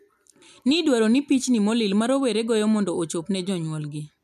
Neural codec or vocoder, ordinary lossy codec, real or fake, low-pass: none; MP3, 96 kbps; real; 14.4 kHz